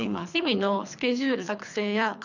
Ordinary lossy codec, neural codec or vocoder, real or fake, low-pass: none; codec, 24 kHz, 3 kbps, HILCodec; fake; 7.2 kHz